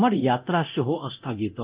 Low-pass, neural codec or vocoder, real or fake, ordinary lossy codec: 3.6 kHz; codec, 24 kHz, 0.9 kbps, DualCodec; fake; Opus, 32 kbps